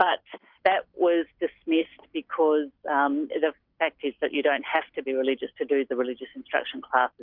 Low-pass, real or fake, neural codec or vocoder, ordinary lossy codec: 5.4 kHz; real; none; Opus, 64 kbps